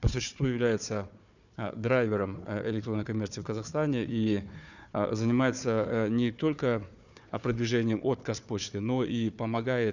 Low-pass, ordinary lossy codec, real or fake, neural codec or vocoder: 7.2 kHz; none; fake; codec, 16 kHz, 4 kbps, FunCodec, trained on Chinese and English, 50 frames a second